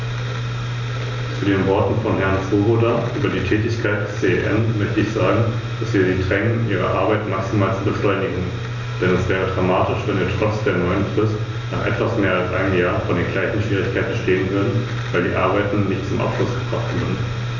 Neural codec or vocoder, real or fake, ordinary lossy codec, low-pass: none; real; none; 7.2 kHz